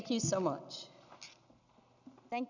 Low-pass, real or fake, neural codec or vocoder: 7.2 kHz; real; none